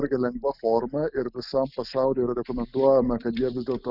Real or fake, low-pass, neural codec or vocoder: real; 5.4 kHz; none